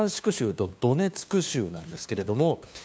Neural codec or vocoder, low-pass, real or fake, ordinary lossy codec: codec, 16 kHz, 2 kbps, FunCodec, trained on LibriTTS, 25 frames a second; none; fake; none